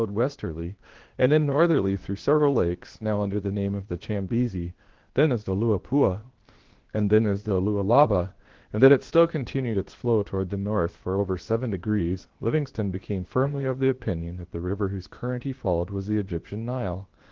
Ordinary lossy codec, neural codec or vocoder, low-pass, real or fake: Opus, 16 kbps; codec, 16 kHz, 0.8 kbps, ZipCodec; 7.2 kHz; fake